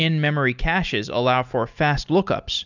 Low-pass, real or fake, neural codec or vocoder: 7.2 kHz; real; none